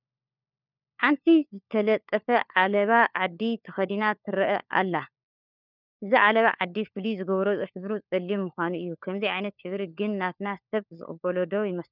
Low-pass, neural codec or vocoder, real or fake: 5.4 kHz; codec, 16 kHz, 4 kbps, FunCodec, trained on LibriTTS, 50 frames a second; fake